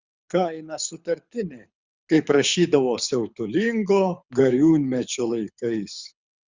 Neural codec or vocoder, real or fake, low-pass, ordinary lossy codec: codec, 24 kHz, 6 kbps, HILCodec; fake; 7.2 kHz; Opus, 64 kbps